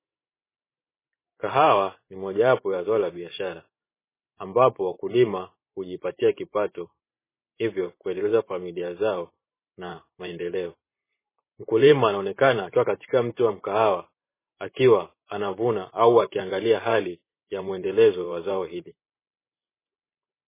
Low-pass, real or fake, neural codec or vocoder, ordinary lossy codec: 3.6 kHz; fake; vocoder, 44.1 kHz, 128 mel bands every 512 samples, BigVGAN v2; MP3, 16 kbps